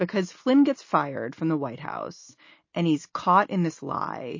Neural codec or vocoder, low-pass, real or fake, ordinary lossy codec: none; 7.2 kHz; real; MP3, 32 kbps